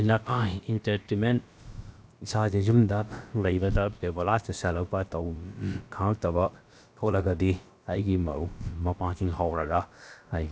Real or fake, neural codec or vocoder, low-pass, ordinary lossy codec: fake; codec, 16 kHz, about 1 kbps, DyCAST, with the encoder's durations; none; none